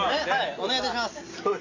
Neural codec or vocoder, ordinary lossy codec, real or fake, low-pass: none; AAC, 48 kbps; real; 7.2 kHz